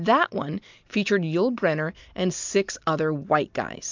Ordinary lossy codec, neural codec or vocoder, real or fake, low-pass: MP3, 64 kbps; vocoder, 44.1 kHz, 128 mel bands every 256 samples, BigVGAN v2; fake; 7.2 kHz